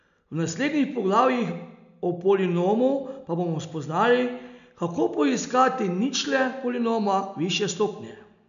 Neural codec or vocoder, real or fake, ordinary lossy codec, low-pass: none; real; MP3, 96 kbps; 7.2 kHz